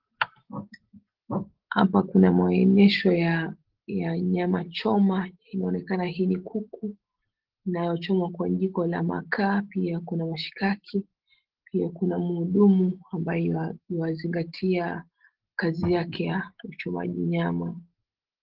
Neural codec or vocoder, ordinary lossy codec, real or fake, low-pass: none; Opus, 16 kbps; real; 5.4 kHz